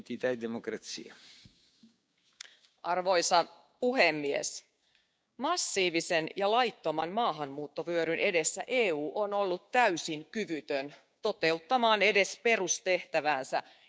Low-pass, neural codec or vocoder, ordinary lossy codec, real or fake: none; codec, 16 kHz, 6 kbps, DAC; none; fake